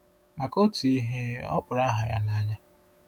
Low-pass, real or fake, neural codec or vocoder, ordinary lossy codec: none; fake; autoencoder, 48 kHz, 128 numbers a frame, DAC-VAE, trained on Japanese speech; none